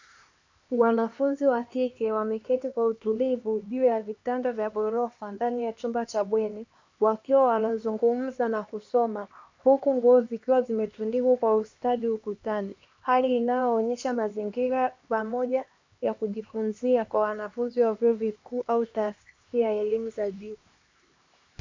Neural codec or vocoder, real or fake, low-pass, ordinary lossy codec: codec, 16 kHz, 2 kbps, X-Codec, HuBERT features, trained on LibriSpeech; fake; 7.2 kHz; MP3, 64 kbps